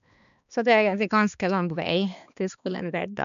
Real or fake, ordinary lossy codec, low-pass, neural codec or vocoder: fake; MP3, 96 kbps; 7.2 kHz; codec, 16 kHz, 2 kbps, X-Codec, HuBERT features, trained on balanced general audio